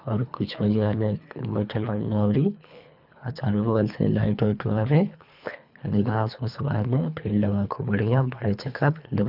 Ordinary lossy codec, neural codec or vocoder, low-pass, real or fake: none; codec, 24 kHz, 3 kbps, HILCodec; 5.4 kHz; fake